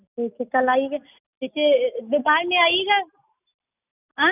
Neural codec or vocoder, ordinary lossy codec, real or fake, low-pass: none; none; real; 3.6 kHz